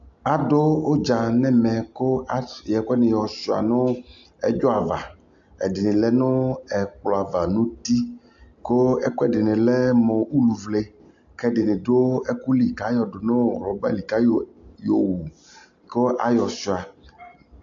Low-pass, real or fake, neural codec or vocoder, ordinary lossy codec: 7.2 kHz; real; none; AAC, 64 kbps